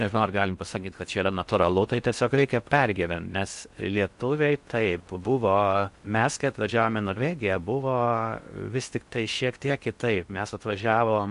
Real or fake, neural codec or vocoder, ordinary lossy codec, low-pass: fake; codec, 16 kHz in and 24 kHz out, 0.6 kbps, FocalCodec, streaming, 4096 codes; MP3, 64 kbps; 10.8 kHz